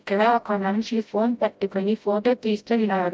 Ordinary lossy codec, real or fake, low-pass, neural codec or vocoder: none; fake; none; codec, 16 kHz, 0.5 kbps, FreqCodec, smaller model